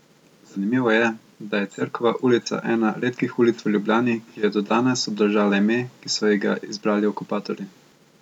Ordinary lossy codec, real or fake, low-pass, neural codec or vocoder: none; real; 19.8 kHz; none